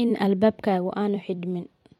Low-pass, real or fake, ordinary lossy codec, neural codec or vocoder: 19.8 kHz; fake; MP3, 64 kbps; vocoder, 44.1 kHz, 128 mel bands every 256 samples, BigVGAN v2